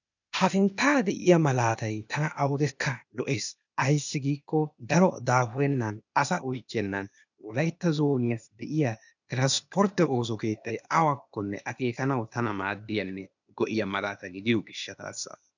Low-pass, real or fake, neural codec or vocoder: 7.2 kHz; fake; codec, 16 kHz, 0.8 kbps, ZipCodec